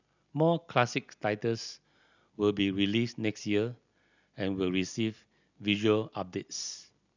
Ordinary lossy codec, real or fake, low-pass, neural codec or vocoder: none; real; 7.2 kHz; none